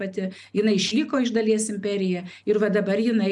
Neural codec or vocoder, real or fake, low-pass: none; real; 10.8 kHz